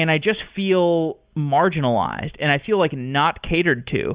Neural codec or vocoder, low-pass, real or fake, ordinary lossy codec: none; 3.6 kHz; real; Opus, 64 kbps